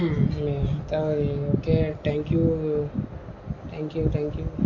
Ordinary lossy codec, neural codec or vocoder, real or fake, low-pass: MP3, 48 kbps; none; real; 7.2 kHz